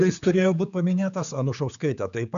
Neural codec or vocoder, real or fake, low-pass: codec, 16 kHz, 4 kbps, X-Codec, HuBERT features, trained on general audio; fake; 7.2 kHz